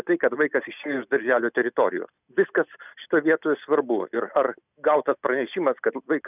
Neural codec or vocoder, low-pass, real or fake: none; 3.6 kHz; real